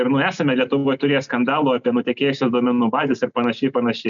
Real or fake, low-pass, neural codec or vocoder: real; 7.2 kHz; none